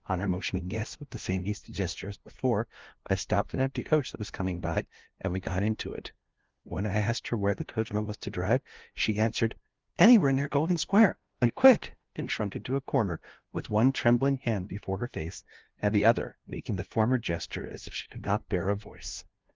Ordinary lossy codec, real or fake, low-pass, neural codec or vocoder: Opus, 16 kbps; fake; 7.2 kHz; codec, 16 kHz, 0.5 kbps, FunCodec, trained on LibriTTS, 25 frames a second